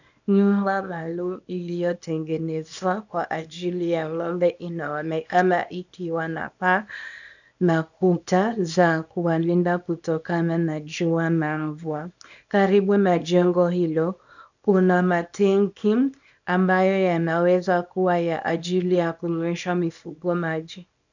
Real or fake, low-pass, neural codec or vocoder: fake; 7.2 kHz; codec, 24 kHz, 0.9 kbps, WavTokenizer, small release